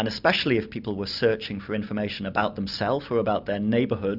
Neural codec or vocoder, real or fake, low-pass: none; real; 5.4 kHz